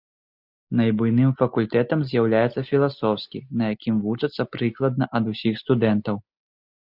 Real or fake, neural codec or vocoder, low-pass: real; none; 5.4 kHz